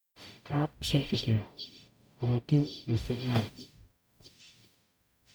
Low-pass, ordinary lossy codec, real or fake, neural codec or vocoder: none; none; fake; codec, 44.1 kHz, 0.9 kbps, DAC